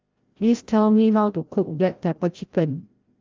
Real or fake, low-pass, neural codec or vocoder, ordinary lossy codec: fake; 7.2 kHz; codec, 16 kHz, 0.5 kbps, FreqCodec, larger model; Opus, 32 kbps